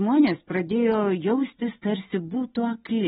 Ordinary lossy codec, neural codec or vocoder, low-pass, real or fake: AAC, 16 kbps; none; 19.8 kHz; real